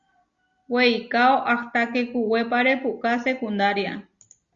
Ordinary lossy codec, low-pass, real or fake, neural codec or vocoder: Opus, 64 kbps; 7.2 kHz; real; none